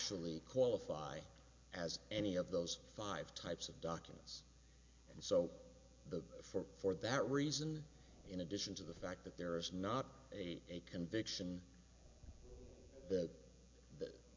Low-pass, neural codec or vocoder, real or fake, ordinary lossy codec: 7.2 kHz; none; real; MP3, 48 kbps